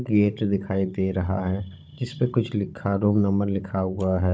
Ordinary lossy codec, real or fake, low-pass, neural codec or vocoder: none; fake; none; codec, 16 kHz, 16 kbps, FunCodec, trained on Chinese and English, 50 frames a second